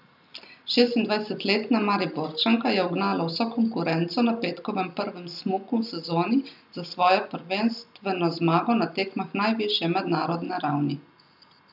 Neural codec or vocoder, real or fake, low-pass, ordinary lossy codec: none; real; 5.4 kHz; none